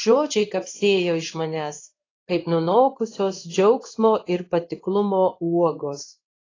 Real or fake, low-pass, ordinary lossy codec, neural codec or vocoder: fake; 7.2 kHz; AAC, 32 kbps; codec, 16 kHz in and 24 kHz out, 1 kbps, XY-Tokenizer